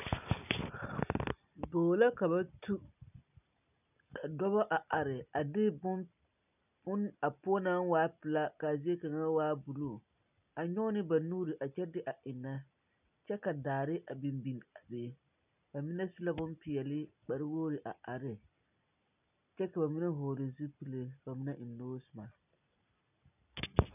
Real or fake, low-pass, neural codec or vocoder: real; 3.6 kHz; none